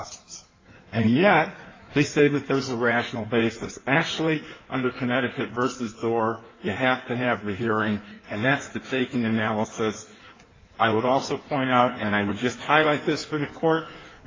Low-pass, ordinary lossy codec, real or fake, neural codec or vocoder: 7.2 kHz; AAC, 32 kbps; fake; codec, 16 kHz in and 24 kHz out, 1.1 kbps, FireRedTTS-2 codec